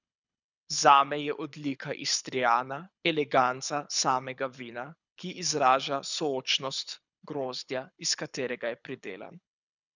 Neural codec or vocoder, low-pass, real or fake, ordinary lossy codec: codec, 24 kHz, 6 kbps, HILCodec; 7.2 kHz; fake; none